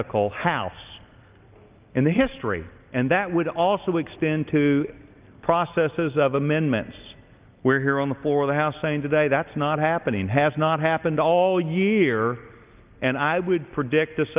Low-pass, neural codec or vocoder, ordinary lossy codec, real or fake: 3.6 kHz; none; Opus, 24 kbps; real